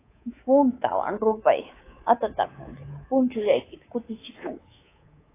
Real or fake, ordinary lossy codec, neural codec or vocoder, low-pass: fake; AAC, 16 kbps; codec, 24 kHz, 3.1 kbps, DualCodec; 3.6 kHz